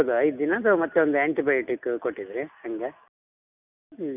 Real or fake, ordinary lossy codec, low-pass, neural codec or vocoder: real; none; 3.6 kHz; none